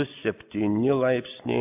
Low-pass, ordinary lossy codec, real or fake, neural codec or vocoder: 3.6 kHz; AAC, 32 kbps; real; none